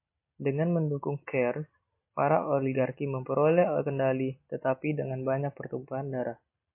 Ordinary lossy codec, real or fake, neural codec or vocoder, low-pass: MP3, 24 kbps; real; none; 3.6 kHz